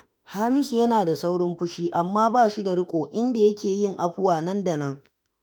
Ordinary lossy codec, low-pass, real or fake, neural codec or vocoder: none; none; fake; autoencoder, 48 kHz, 32 numbers a frame, DAC-VAE, trained on Japanese speech